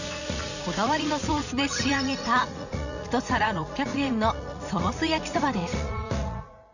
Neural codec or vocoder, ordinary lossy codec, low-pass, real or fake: vocoder, 44.1 kHz, 80 mel bands, Vocos; none; 7.2 kHz; fake